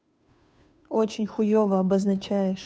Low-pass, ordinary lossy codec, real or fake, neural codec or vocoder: none; none; fake; codec, 16 kHz, 2 kbps, FunCodec, trained on Chinese and English, 25 frames a second